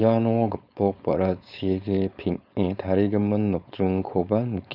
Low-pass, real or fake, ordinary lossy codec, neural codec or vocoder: 5.4 kHz; fake; none; codec, 16 kHz, 4.8 kbps, FACodec